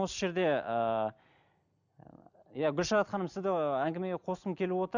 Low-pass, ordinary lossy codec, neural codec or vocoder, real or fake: 7.2 kHz; none; none; real